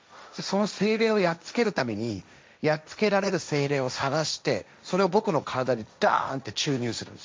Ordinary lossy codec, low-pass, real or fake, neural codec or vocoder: none; none; fake; codec, 16 kHz, 1.1 kbps, Voila-Tokenizer